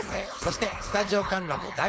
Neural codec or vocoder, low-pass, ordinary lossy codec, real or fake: codec, 16 kHz, 4.8 kbps, FACodec; none; none; fake